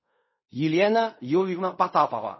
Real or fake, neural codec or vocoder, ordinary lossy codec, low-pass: fake; codec, 16 kHz in and 24 kHz out, 0.4 kbps, LongCat-Audio-Codec, fine tuned four codebook decoder; MP3, 24 kbps; 7.2 kHz